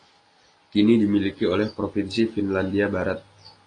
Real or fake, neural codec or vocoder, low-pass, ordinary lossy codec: real; none; 9.9 kHz; AAC, 32 kbps